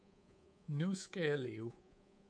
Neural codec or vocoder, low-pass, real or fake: codec, 24 kHz, 3.1 kbps, DualCodec; 9.9 kHz; fake